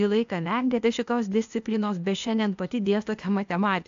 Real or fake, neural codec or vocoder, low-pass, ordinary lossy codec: fake; codec, 16 kHz, 0.8 kbps, ZipCodec; 7.2 kHz; AAC, 64 kbps